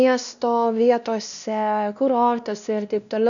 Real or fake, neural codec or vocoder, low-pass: fake; codec, 16 kHz, 1 kbps, X-Codec, WavLM features, trained on Multilingual LibriSpeech; 7.2 kHz